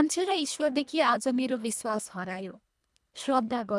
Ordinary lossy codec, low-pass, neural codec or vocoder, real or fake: none; none; codec, 24 kHz, 1.5 kbps, HILCodec; fake